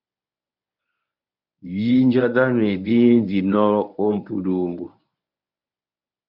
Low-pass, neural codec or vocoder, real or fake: 5.4 kHz; codec, 24 kHz, 0.9 kbps, WavTokenizer, medium speech release version 1; fake